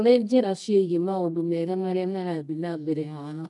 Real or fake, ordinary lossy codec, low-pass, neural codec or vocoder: fake; none; 10.8 kHz; codec, 24 kHz, 0.9 kbps, WavTokenizer, medium music audio release